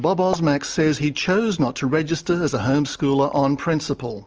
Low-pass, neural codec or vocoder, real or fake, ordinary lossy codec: 7.2 kHz; none; real; Opus, 24 kbps